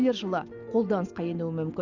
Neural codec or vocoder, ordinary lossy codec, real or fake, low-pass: none; Opus, 64 kbps; real; 7.2 kHz